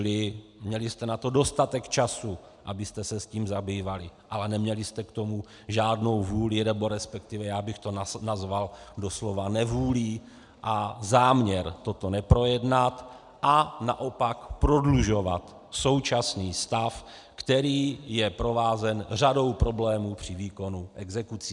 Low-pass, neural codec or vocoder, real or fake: 10.8 kHz; none; real